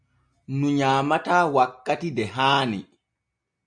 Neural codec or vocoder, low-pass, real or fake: none; 9.9 kHz; real